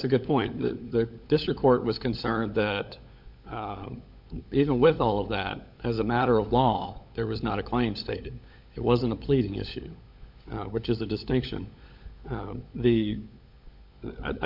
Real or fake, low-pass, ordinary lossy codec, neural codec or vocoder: fake; 5.4 kHz; MP3, 48 kbps; codec, 16 kHz, 16 kbps, FunCodec, trained on LibriTTS, 50 frames a second